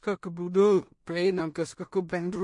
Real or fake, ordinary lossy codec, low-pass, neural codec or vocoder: fake; MP3, 48 kbps; 10.8 kHz; codec, 16 kHz in and 24 kHz out, 0.4 kbps, LongCat-Audio-Codec, two codebook decoder